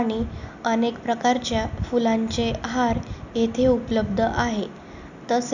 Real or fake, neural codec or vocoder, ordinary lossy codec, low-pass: real; none; none; 7.2 kHz